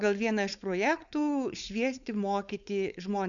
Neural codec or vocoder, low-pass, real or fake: codec, 16 kHz, 4.8 kbps, FACodec; 7.2 kHz; fake